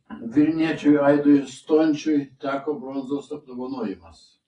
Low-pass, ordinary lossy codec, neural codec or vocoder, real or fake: 10.8 kHz; AAC, 32 kbps; none; real